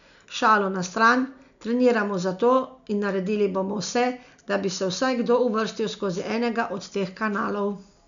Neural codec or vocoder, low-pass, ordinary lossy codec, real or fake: none; 7.2 kHz; none; real